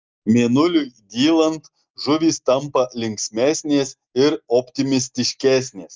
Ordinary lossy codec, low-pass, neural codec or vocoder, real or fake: Opus, 32 kbps; 7.2 kHz; none; real